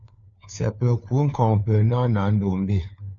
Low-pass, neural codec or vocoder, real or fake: 7.2 kHz; codec, 16 kHz, 4 kbps, FunCodec, trained on LibriTTS, 50 frames a second; fake